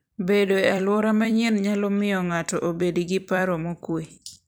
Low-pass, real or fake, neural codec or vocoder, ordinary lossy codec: none; fake; vocoder, 44.1 kHz, 128 mel bands every 256 samples, BigVGAN v2; none